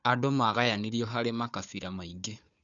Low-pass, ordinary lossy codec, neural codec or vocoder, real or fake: 7.2 kHz; none; codec, 16 kHz, 4 kbps, FunCodec, trained on Chinese and English, 50 frames a second; fake